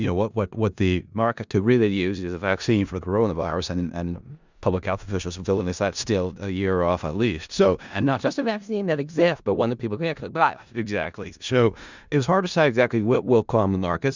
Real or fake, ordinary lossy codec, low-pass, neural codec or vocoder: fake; Opus, 64 kbps; 7.2 kHz; codec, 16 kHz in and 24 kHz out, 0.4 kbps, LongCat-Audio-Codec, four codebook decoder